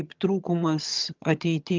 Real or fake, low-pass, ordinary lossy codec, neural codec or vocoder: fake; 7.2 kHz; Opus, 24 kbps; vocoder, 22.05 kHz, 80 mel bands, HiFi-GAN